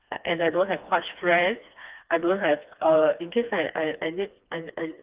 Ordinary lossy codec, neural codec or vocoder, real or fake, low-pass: Opus, 32 kbps; codec, 16 kHz, 2 kbps, FreqCodec, smaller model; fake; 3.6 kHz